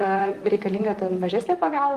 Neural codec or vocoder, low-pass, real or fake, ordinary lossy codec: vocoder, 44.1 kHz, 128 mel bands, Pupu-Vocoder; 14.4 kHz; fake; Opus, 24 kbps